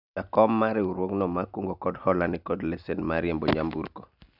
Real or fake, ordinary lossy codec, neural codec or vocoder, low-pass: real; none; none; 5.4 kHz